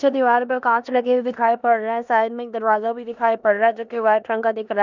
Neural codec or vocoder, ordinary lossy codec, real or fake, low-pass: codec, 16 kHz in and 24 kHz out, 0.9 kbps, LongCat-Audio-Codec, four codebook decoder; none; fake; 7.2 kHz